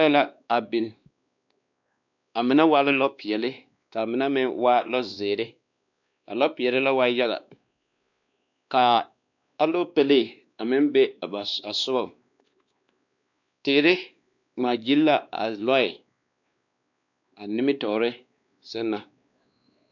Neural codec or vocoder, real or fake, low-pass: codec, 16 kHz, 2 kbps, X-Codec, WavLM features, trained on Multilingual LibriSpeech; fake; 7.2 kHz